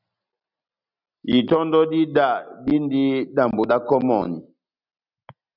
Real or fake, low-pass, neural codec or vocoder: real; 5.4 kHz; none